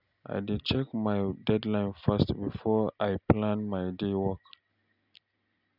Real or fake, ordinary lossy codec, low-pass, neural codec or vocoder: real; none; 5.4 kHz; none